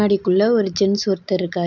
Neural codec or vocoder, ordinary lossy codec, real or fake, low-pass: none; none; real; 7.2 kHz